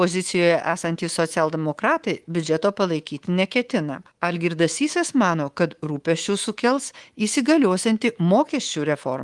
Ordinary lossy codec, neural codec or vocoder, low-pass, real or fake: Opus, 24 kbps; codec, 24 kHz, 3.1 kbps, DualCodec; 10.8 kHz; fake